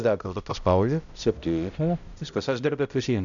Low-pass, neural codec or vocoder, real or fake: 7.2 kHz; codec, 16 kHz, 0.5 kbps, X-Codec, HuBERT features, trained on balanced general audio; fake